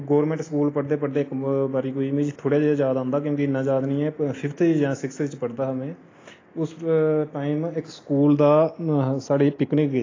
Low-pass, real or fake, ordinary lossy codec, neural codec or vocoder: 7.2 kHz; real; AAC, 32 kbps; none